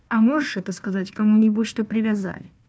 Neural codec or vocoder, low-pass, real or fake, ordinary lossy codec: codec, 16 kHz, 1 kbps, FunCodec, trained on Chinese and English, 50 frames a second; none; fake; none